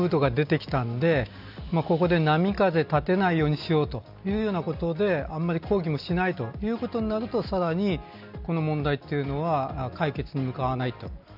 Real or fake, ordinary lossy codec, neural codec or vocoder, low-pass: real; none; none; 5.4 kHz